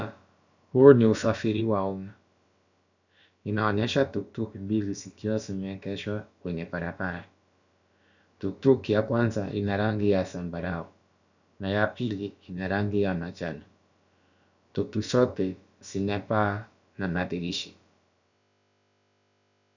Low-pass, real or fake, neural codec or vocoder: 7.2 kHz; fake; codec, 16 kHz, about 1 kbps, DyCAST, with the encoder's durations